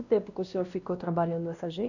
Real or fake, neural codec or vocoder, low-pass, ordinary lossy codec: fake; codec, 16 kHz, 1 kbps, X-Codec, WavLM features, trained on Multilingual LibriSpeech; 7.2 kHz; none